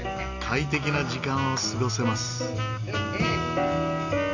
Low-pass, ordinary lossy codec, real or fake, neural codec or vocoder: 7.2 kHz; none; real; none